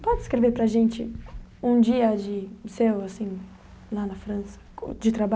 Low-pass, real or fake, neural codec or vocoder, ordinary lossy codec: none; real; none; none